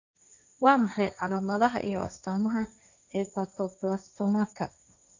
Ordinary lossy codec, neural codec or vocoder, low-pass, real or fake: none; codec, 16 kHz, 1.1 kbps, Voila-Tokenizer; 7.2 kHz; fake